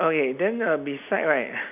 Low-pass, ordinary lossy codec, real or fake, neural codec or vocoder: 3.6 kHz; none; real; none